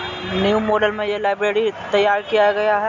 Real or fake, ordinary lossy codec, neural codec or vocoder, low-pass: real; none; none; 7.2 kHz